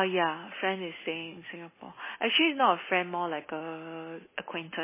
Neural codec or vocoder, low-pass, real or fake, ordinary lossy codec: none; 3.6 kHz; real; MP3, 16 kbps